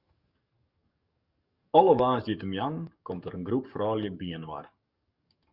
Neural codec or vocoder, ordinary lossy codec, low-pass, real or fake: codec, 44.1 kHz, 7.8 kbps, DAC; Opus, 64 kbps; 5.4 kHz; fake